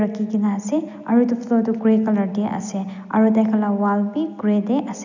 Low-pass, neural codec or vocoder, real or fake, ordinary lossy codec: 7.2 kHz; none; real; none